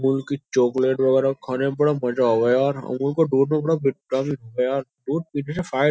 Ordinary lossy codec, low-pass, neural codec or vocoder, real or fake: none; none; none; real